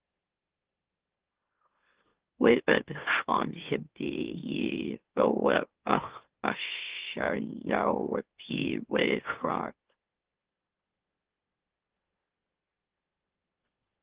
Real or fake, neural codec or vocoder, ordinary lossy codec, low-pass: fake; autoencoder, 44.1 kHz, a latent of 192 numbers a frame, MeloTTS; Opus, 16 kbps; 3.6 kHz